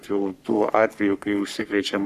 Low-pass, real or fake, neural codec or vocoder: 14.4 kHz; fake; codec, 44.1 kHz, 3.4 kbps, Pupu-Codec